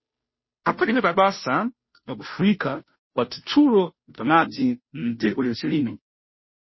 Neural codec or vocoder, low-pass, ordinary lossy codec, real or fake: codec, 16 kHz, 0.5 kbps, FunCodec, trained on Chinese and English, 25 frames a second; 7.2 kHz; MP3, 24 kbps; fake